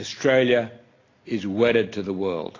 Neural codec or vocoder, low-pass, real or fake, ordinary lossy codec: none; 7.2 kHz; real; AAC, 32 kbps